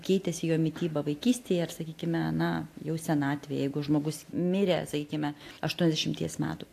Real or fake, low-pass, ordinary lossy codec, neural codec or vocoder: real; 14.4 kHz; AAC, 64 kbps; none